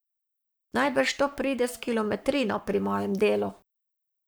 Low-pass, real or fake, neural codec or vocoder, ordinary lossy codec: none; fake; codec, 44.1 kHz, 7.8 kbps, Pupu-Codec; none